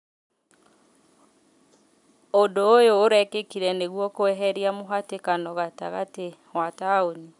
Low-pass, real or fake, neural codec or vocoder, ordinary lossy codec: 10.8 kHz; real; none; none